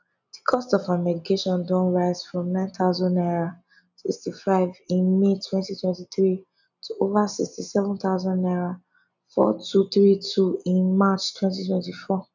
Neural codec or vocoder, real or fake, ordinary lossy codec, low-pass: none; real; none; 7.2 kHz